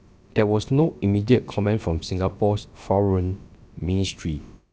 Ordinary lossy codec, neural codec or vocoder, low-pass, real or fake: none; codec, 16 kHz, about 1 kbps, DyCAST, with the encoder's durations; none; fake